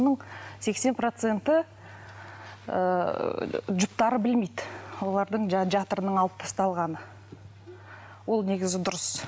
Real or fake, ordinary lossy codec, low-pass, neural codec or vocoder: real; none; none; none